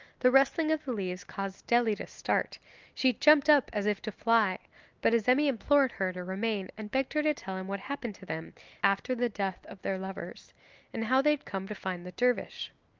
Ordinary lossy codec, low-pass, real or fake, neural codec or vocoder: Opus, 24 kbps; 7.2 kHz; real; none